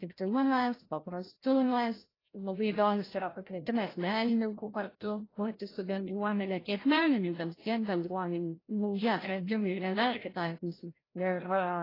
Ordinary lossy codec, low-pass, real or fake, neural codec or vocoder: AAC, 24 kbps; 5.4 kHz; fake; codec, 16 kHz, 0.5 kbps, FreqCodec, larger model